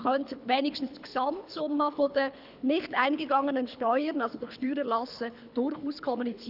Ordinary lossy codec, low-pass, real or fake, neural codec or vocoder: none; 5.4 kHz; fake; codec, 24 kHz, 3 kbps, HILCodec